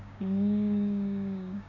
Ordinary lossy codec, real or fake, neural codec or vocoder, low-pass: none; fake; codec, 16 kHz, 6 kbps, DAC; 7.2 kHz